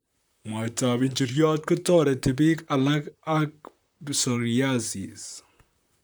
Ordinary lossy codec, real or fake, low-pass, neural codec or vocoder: none; fake; none; vocoder, 44.1 kHz, 128 mel bands, Pupu-Vocoder